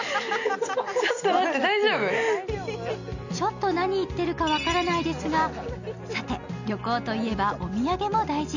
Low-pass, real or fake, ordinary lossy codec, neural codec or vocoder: 7.2 kHz; real; none; none